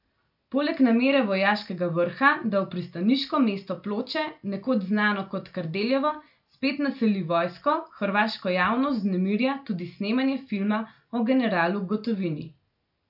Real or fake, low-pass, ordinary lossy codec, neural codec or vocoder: real; 5.4 kHz; none; none